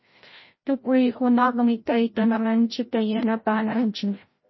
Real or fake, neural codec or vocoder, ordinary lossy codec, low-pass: fake; codec, 16 kHz, 0.5 kbps, FreqCodec, larger model; MP3, 24 kbps; 7.2 kHz